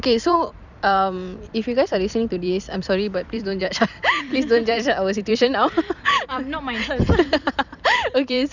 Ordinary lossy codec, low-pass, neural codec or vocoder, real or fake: none; 7.2 kHz; vocoder, 44.1 kHz, 128 mel bands every 256 samples, BigVGAN v2; fake